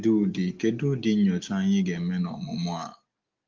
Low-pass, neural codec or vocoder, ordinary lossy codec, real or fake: 7.2 kHz; none; Opus, 32 kbps; real